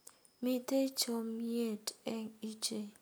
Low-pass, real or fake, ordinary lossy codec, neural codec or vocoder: none; real; none; none